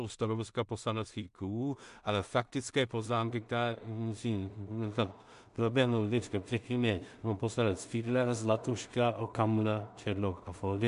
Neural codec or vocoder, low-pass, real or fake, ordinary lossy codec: codec, 16 kHz in and 24 kHz out, 0.4 kbps, LongCat-Audio-Codec, two codebook decoder; 10.8 kHz; fake; MP3, 64 kbps